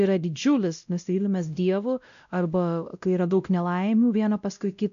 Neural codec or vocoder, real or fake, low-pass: codec, 16 kHz, 0.5 kbps, X-Codec, WavLM features, trained on Multilingual LibriSpeech; fake; 7.2 kHz